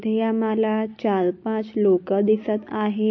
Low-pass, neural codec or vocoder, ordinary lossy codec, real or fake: 7.2 kHz; none; MP3, 32 kbps; real